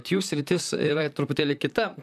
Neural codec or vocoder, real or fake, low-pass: vocoder, 44.1 kHz, 128 mel bands, Pupu-Vocoder; fake; 14.4 kHz